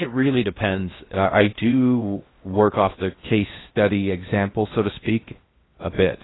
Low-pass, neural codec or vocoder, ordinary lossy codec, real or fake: 7.2 kHz; codec, 16 kHz in and 24 kHz out, 0.6 kbps, FocalCodec, streaming, 2048 codes; AAC, 16 kbps; fake